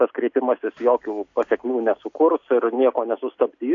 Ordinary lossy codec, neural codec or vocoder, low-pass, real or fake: AAC, 48 kbps; none; 7.2 kHz; real